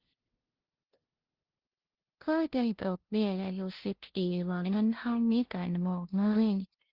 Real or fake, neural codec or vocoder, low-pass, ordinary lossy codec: fake; codec, 16 kHz, 0.5 kbps, FunCodec, trained on LibriTTS, 25 frames a second; 5.4 kHz; Opus, 16 kbps